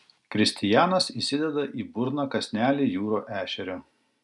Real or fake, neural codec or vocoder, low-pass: real; none; 10.8 kHz